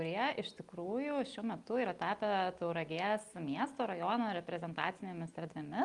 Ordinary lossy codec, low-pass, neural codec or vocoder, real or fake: Opus, 32 kbps; 10.8 kHz; none; real